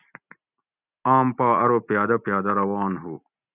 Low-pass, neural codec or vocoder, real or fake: 3.6 kHz; none; real